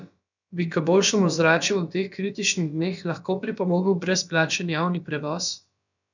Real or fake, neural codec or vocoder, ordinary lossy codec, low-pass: fake; codec, 16 kHz, about 1 kbps, DyCAST, with the encoder's durations; none; 7.2 kHz